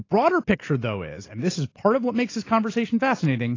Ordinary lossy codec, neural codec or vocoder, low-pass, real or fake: AAC, 32 kbps; none; 7.2 kHz; real